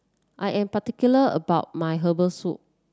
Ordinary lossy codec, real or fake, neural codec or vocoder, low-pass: none; real; none; none